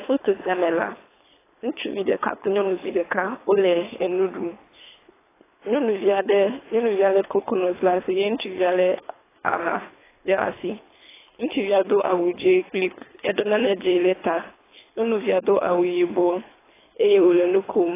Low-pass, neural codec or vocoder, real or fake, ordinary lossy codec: 3.6 kHz; codec, 24 kHz, 3 kbps, HILCodec; fake; AAC, 16 kbps